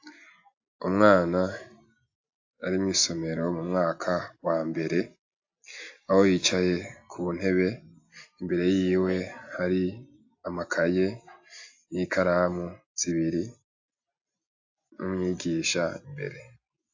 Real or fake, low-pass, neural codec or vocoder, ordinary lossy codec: real; 7.2 kHz; none; AAC, 48 kbps